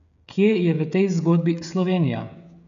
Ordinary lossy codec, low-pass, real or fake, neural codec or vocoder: none; 7.2 kHz; fake; codec, 16 kHz, 16 kbps, FreqCodec, smaller model